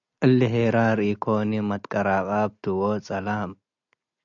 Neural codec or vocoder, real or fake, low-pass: none; real; 7.2 kHz